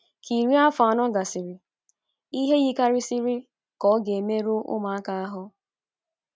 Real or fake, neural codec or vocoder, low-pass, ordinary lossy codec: real; none; none; none